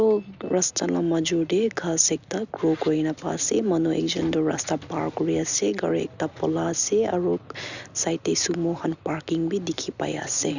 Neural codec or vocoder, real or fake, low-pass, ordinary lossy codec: none; real; 7.2 kHz; none